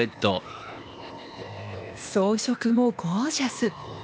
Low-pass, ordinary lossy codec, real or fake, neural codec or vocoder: none; none; fake; codec, 16 kHz, 0.8 kbps, ZipCodec